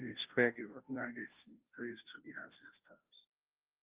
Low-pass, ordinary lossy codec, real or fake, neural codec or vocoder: 3.6 kHz; Opus, 24 kbps; fake; codec, 16 kHz, 0.5 kbps, FunCodec, trained on Chinese and English, 25 frames a second